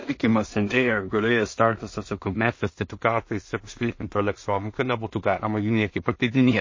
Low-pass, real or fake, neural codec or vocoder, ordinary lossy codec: 7.2 kHz; fake; codec, 16 kHz in and 24 kHz out, 0.4 kbps, LongCat-Audio-Codec, two codebook decoder; MP3, 32 kbps